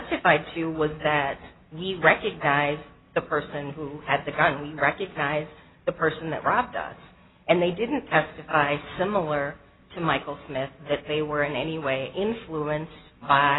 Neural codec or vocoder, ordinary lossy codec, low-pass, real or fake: none; AAC, 16 kbps; 7.2 kHz; real